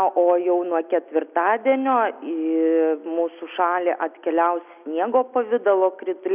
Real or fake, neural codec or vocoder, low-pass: real; none; 3.6 kHz